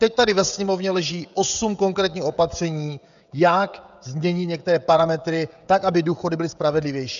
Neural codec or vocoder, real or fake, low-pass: codec, 16 kHz, 16 kbps, FreqCodec, smaller model; fake; 7.2 kHz